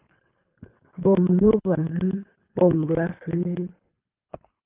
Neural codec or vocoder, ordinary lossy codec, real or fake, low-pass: codec, 24 kHz, 3 kbps, HILCodec; Opus, 24 kbps; fake; 3.6 kHz